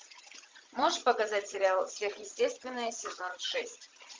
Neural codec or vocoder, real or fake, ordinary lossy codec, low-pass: vocoder, 22.05 kHz, 80 mel bands, Vocos; fake; Opus, 32 kbps; 7.2 kHz